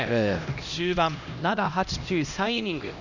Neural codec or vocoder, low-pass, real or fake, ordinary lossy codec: codec, 16 kHz, 1 kbps, X-Codec, HuBERT features, trained on LibriSpeech; 7.2 kHz; fake; none